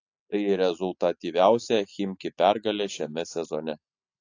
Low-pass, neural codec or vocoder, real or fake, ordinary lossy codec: 7.2 kHz; none; real; AAC, 48 kbps